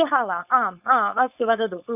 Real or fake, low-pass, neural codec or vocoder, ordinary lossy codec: fake; 3.6 kHz; codec, 16 kHz, 4.8 kbps, FACodec; none